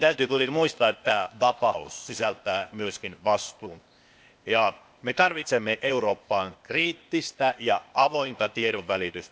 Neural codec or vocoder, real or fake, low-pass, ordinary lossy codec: codec, 16 kHz, 0.8 kbps, ZipCodec; fake; none; none